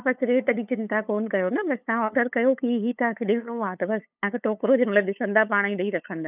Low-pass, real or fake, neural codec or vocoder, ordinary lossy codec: 3.6 kHz; fake; codec, 16 kHz, 8 kbps, FunCodec, trained on LibriTTS, 25 frames a second; none